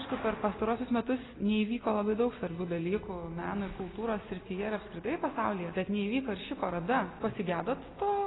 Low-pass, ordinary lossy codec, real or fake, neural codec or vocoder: 7.2 kHz; AAC, 16 kbps; real; none